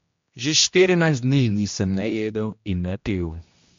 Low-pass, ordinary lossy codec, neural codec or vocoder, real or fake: 7.2 kHz; MP3, 48 kbps; codec, 16 kHz, 1 kbps, X-Codec, HuBERT features, trained on general audio; fake